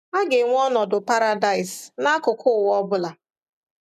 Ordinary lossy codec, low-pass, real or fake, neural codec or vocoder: none; 14.4 kHz; real; none